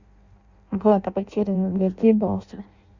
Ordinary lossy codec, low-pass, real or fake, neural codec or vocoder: Opus, 64 kbps; 7.2 kHz; fake; codec, 16 kHz in and 24 kHz out, 0.6 kbps, FireRedTTS-2 codec